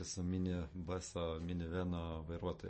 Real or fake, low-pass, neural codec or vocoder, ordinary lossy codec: real; 10.8 kHz; none; MP3, 32 kbps